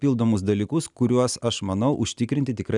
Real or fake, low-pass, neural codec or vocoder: real; 10.8 kHz; none